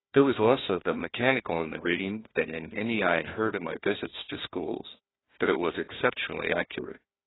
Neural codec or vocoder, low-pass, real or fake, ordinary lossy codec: codec, 16 kHz, 1 kbps, FunCodec, trained on Chinese and English, 50 frames a second; 7.2 kHz; fake; AAC, 16 kbps